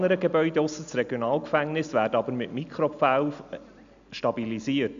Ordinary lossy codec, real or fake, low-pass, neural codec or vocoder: none; real; 7.2 kHz; none